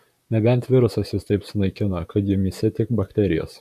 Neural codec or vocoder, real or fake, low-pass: vocoder, 44.1 kHz, 128 mel bands, Pupu-Vocoder; fake; 14.4 kHz